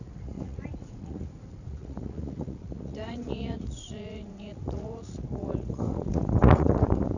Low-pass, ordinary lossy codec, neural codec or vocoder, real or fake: 7.2 kHz; none; vocoder, 44.1 kHz, 80 mel bands, Vocos; fake